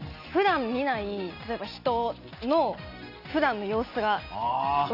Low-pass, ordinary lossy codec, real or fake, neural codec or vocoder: 5.4 kHz; Opus, 64 kbps; real; none